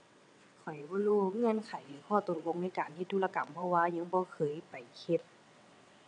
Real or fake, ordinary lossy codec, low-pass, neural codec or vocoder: fake; none; 9.9 kHz; vocoder, 22.05 kHz, 80 mel bands, WaveNeXt